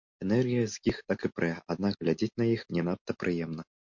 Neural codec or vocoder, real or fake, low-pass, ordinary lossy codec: none; real; 7.2 kHz; MP3, 48 kbps